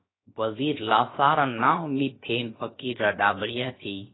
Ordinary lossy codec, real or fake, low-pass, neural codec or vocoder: AAC, 16 kbps; fake; 7.2 kHz; codec, 16 kHz, about 1 kbps, DyCAST, with the encoder's durations